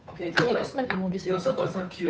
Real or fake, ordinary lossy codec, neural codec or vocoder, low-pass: fake; none; codec, 16 kHz, 2 kbps, FunCodec, trained on Chinese and English, 25 frames a second; none